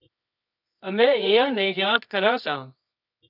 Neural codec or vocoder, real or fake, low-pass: codec, 24 kHz, 0.9 kbps, WavTokenizer, medium music audio release; fake; 5.4 kHz